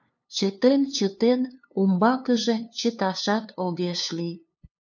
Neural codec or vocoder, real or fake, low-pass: codec, 16 kHz, 2 kbps, FunCodec, trained on LibriTTS, 25 frames a second; fake; 7.2 kHz